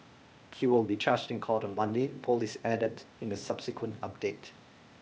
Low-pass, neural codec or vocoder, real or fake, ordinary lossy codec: none; codec, 16 kHz, 0.8 kbps, ZipCodec; fake; none